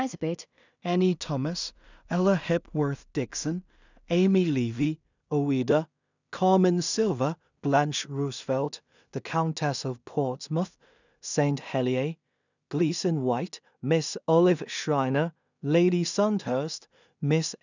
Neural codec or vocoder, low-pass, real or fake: codec, 16 kHz in and 24 kHz out, 0.4 kbps, LongCat-Audio-Codec, two codebook decoder; 7.2 kHz; fake